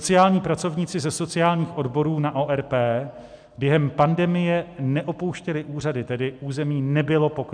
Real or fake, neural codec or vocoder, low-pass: real; none; 9.9 kHz